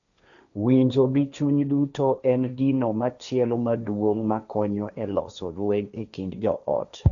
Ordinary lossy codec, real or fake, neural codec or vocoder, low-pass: none; fake; codec, 16 kHz, 1.1 kbps, Voila-Tokenizer; 7.2 kHz